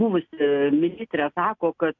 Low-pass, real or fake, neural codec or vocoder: 7.2 kHz; real; none